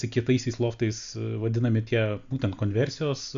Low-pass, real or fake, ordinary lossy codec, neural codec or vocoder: 7.2 kHz; real; MP3, 64 kbps; none